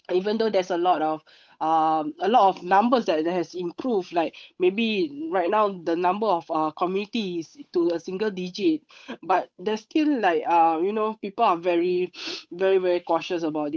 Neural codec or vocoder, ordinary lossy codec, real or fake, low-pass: codec, 16 kHz, 8 kbps, FunCodec, trained on Chinese and English, 25 frames a second; none; fake; none